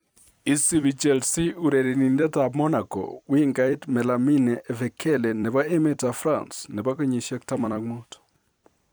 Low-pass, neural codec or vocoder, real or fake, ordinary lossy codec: none; vocoder, 44.1 kHz, 128 mel bands every 256 samples, BigVGAN v2; fake; none